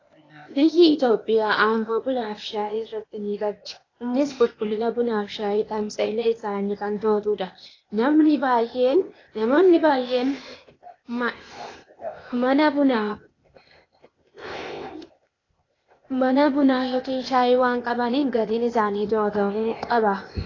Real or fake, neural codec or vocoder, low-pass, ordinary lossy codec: fake; codec, 16 kHz, 0.8 kbps, ZipCodec; 7.2 kHz; AAC, 32 kbps